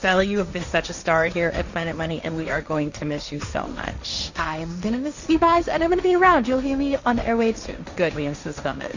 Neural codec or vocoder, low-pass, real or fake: codec, 16 kHz, 1.1 kbps, Voila-Tokenizer; 7.2 kHz; fake